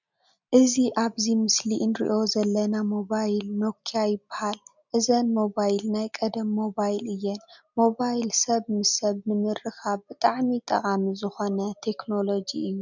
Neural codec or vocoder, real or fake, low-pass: none; real; 7.2 kHz